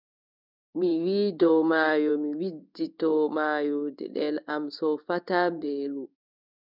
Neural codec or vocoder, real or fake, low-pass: codec, 16 kHz in and 24 kHz out, 1 kbps, XY-Tokenizer; fake; 5.4 kHz